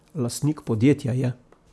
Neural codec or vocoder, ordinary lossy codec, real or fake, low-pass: none; none; real; none